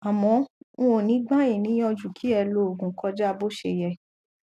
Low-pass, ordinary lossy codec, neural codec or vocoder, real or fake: 14.4 kHz; none; none; real